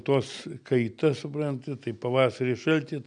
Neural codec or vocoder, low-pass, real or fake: none; 9.9 kHz; real